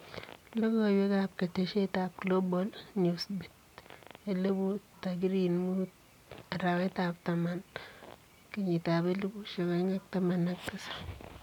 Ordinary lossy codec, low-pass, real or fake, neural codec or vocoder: none; 19.8 kHz; real; none